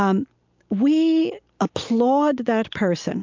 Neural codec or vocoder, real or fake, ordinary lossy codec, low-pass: none; real; MP3, 64 kbps; 7.2 kHz